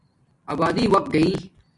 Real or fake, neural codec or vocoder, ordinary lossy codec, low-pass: real; none; AAC, 64 kbps; 10.8 kHz